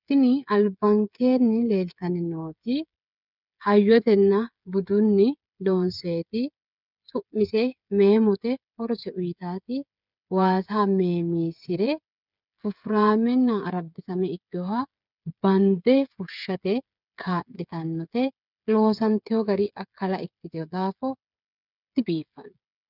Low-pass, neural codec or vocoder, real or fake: 5.4 kHz; codec, 16 kHz, 8 kbps, FreqCodec, smaller model; fake